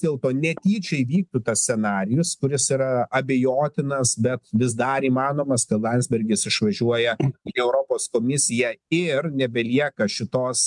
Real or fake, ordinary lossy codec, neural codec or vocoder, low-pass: real; MP3, 96 kbps; none; 10.8 kHz